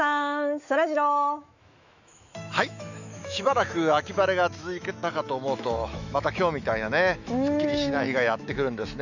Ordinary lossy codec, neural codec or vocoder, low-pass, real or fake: none; autoencoder, 48 kHz, 128 numbers a frame, DAC-VAE, trained on Japanese speech; 7.2 kHz; fake